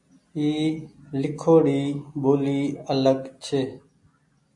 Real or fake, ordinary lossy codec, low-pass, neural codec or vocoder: real; MP3, 48 kbps; 10.8 kHz; none